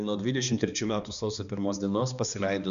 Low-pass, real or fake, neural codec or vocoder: 7.2 kHz; fake; codec, 16 kHz, 2 kbps, X-Codec, HuBERT features, trained on balanced general audio